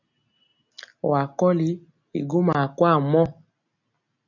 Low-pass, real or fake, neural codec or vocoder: 7.2 kHz; real; none